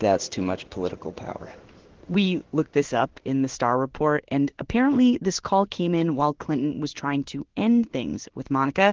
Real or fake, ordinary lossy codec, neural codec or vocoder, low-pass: fake; Opus, 16 kbps; codec, 16 kHz in and 24 kHz out, 1 kbps, XY-Tokenizer; 7.2 kHz